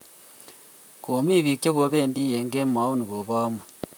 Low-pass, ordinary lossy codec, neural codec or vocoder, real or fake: none; none; vocoder, 44.1 kHz, 128 mel bands, Pupu-Vocoder; fake